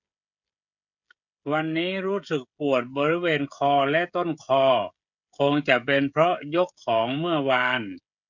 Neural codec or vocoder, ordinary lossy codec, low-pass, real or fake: codec, 16 kHz, 16 kbps, FreqCodec, smaller model; none; 7.2 kHz; fake